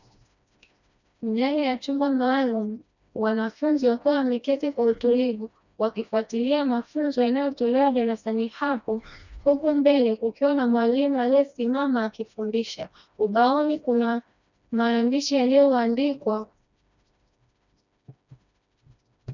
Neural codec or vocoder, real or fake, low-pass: codec, 16 kHz, 1 kbps, FreqCodec, smaller model; fake; 7.2 kHz